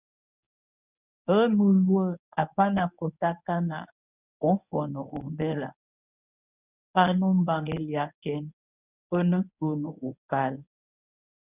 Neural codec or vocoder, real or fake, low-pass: codec, 24 kHz, 0.9 kbps, WavTokenizer, medium speech release version 1; fake; 3.6 kHz